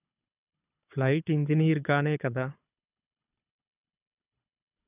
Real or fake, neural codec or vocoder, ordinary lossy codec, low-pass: fake; codec, 24 kHz, 6 kbps, HILCodec; none; 3.6 kHz